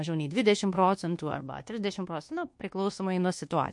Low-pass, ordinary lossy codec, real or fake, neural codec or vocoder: 10.8 kHz; MP3, 48 kbps; fake; codec, 24 kHz, 1.2 kbps, DualCodec